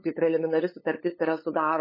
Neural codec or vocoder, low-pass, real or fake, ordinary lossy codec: codec, 16 kHz, 8 kbps, FunCodec, trained on LibriTTS, 25 frames a second; 5.4 kHz; fake; MP3, 24 kbps